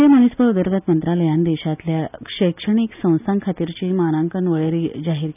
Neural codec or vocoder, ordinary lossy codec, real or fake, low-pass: none; none; real; 3.6 kHz